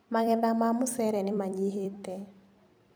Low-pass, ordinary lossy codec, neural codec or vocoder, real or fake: none; none; vocoder, 44.1 kHz, 128 mel bands every 256 samples, BigVGAN v2; fake